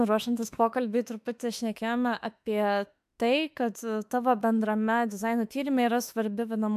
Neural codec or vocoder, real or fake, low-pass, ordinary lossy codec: autoencoder, 48 kHz, 32 numbers a frame, DAC-VAE, trained on Japanese speech; fake; 14.4 kHz; AAC, 96 kbps